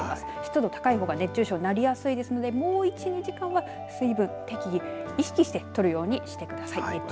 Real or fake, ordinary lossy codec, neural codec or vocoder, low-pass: real; none; none; none